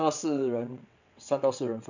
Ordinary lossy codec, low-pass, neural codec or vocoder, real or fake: none; 7.2 kHz; vocoder, 22.05 kHz, 80 mel bands, WaveNeXt; fake